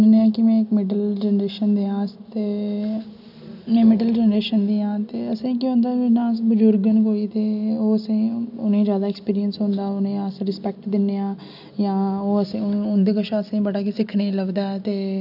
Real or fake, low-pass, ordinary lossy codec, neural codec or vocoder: real; 5.4 kHz; none; none